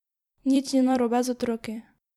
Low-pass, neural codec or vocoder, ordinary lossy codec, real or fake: 19.8 kHz; vocoder, 44.1 kHz, 128 mel bands every 256 samples, BigVGAN v2; MP3, 96 kbps; fake